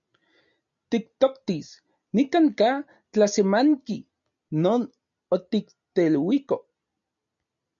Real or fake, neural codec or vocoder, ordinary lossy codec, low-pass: real; none; MP3, 96 kbps; 7.2 kHz